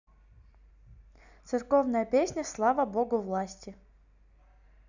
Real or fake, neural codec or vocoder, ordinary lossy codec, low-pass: real; none; none; 7.2 kHz